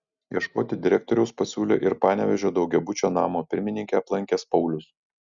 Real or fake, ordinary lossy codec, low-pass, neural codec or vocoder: real; Opus, 64 kbps; 7.2 kHz; none